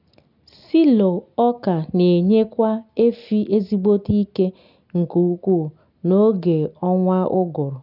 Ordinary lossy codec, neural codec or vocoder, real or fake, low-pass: none; none; real; 5.4 kHz